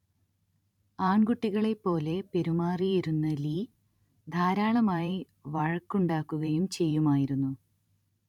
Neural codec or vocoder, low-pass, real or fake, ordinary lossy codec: vocoder, 44.1 kHz, 128 mel bands every 512 samples, BigVGAN v2; 19.8 kHz; fake; none